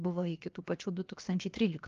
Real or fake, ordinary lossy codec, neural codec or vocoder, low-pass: fake; Opus, 32 kbps; codec, 16 kHz, 0.7 kbps, FocalCodec; 7.2 kHz